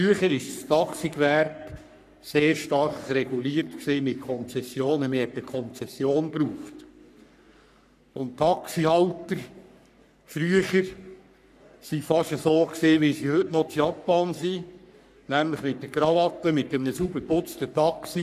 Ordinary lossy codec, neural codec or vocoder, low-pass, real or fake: none; codec, 44.1 kHz, 3.4 kbps, Pupu-Codec; 14.4 kHz; fake